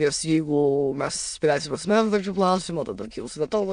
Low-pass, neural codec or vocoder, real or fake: 9.9 kHz; autoencoder, 22.05 kHz, a latent of 192 numbers a frame, VITS, trained on many speakers; fake